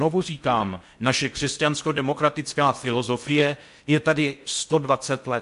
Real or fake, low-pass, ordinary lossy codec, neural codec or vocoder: fake; 10.8 kHz; MP3, 64 kbps; codec, 16 kHz in and 24 kHz out, 0.6 kbps, FocalCodec, streaming, 4096 codes